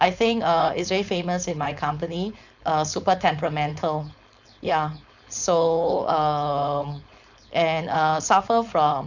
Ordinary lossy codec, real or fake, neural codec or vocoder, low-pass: none; fake; codec, 16 kHz, 4.8 kbps, FACodec; 7.2 kHz